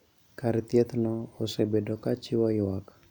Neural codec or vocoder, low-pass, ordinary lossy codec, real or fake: none; 19.8 kHz; none; real